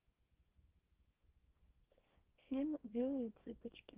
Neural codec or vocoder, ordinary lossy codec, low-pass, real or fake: codec, 24 kHz, 0.9 kbps, WavTokenizer, small release; Opus, 16 kbps; 3.6 kHz; fake